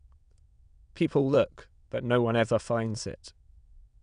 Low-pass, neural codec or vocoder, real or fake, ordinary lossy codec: 9.9 kHz; autoencoder, 22.05 kHz, a latent of 192 numbers a frame, VITS, trained on many speakers; fake; none